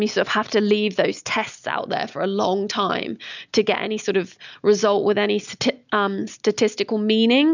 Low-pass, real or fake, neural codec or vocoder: 7.2 kHz; real; none